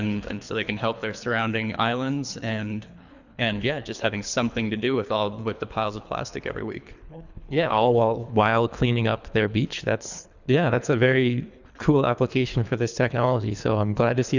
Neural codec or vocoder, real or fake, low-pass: codec, 24 kHz, 3 kbps, HILCodec; fake; 7.2 kHz